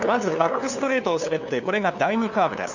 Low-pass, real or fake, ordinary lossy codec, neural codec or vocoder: 7.2 kHz; fake; none; codec, 16 kHz, 2 kbps, FunCodec, trained on LibriTTS, 25 frames a second